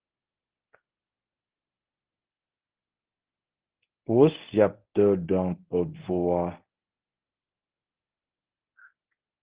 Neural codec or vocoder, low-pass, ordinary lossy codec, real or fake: codec, 24 kHz, 0.9 kbps, WavTokenizer, medium speech release version 1; 3.6 kHz; Opus, 16 kbps; fake